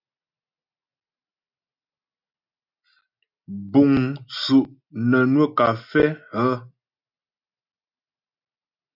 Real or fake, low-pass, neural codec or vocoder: real; 5.4 kHz; none